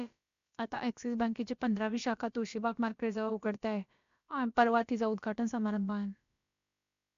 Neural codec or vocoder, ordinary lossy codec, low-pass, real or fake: codec, 16 kHz, about 1 kbps, DyCAST, with the encoder's durations; MP3, 64 kbps; 7.2 kHz; fake